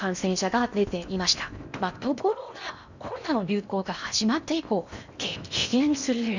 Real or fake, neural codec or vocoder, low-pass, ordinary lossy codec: fake; codec, 16 kHz in and 24 kHz out, 0.8 kbps, FocalCodec, streaming, 65536 codes; 7.2 kHz; none